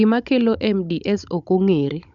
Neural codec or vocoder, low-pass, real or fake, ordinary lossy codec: codec, 16 kHz, 16 kbps, FunCodec, trained on Chinese and English, 50 frames a second; 7.2 kHz; fake; MP3, 96 kbps